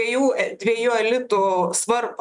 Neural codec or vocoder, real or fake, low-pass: none; real; 10.8 kHz